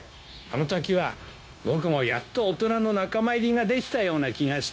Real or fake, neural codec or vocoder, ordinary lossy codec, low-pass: fake; codec, 16 kHz, 0.9 kbps, LongCat-Audio-Codec; none; none